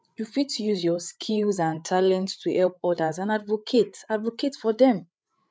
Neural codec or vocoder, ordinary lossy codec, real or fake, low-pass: codec, 16 kHz, 8 kbps, FreqCodec, larger model; none; fake; none